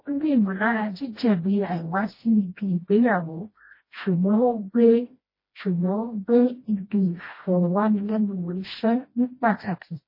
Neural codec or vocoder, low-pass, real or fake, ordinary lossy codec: codec, 16 kHz, 1 kbps, FreqCodec, smaller model; 5.4 kHz; fake; MP3, 24 kbps